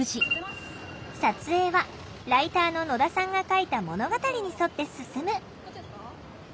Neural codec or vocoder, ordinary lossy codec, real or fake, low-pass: none; none; real; none